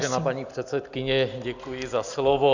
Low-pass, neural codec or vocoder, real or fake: 7.2 kHz; none; real